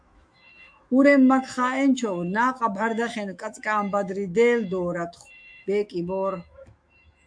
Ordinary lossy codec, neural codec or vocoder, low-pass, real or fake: AAC, 64 kbps; autoencoder, 48 kHz, 128 numbers a frame, DAC-VAE, trained on Japanese speech; 9.9 kHz; fake